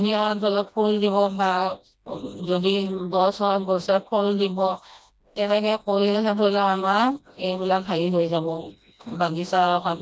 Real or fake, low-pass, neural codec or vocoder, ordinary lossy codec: fake; none; codec, 16 kHz, 1 kbps, FreqCodec, smaller model; none